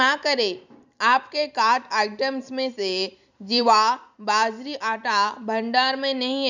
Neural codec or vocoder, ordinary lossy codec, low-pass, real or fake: vocoder, 44.1 kHz, 128 mel bands every 256 samples, BigVGAN v2; none; 7.2 kHz; fake